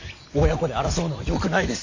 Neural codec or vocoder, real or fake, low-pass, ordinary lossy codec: none; real; 7.2 kHz; AAC, 48 kbps